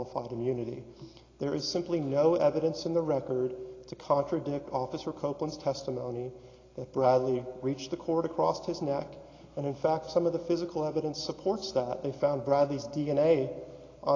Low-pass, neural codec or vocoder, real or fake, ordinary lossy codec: 7.2 kHz; none; real; AAC, 32 kbps